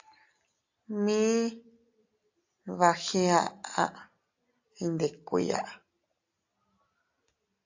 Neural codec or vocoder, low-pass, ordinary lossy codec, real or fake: none; 7.2 kHz; AAC, 48 kbps; real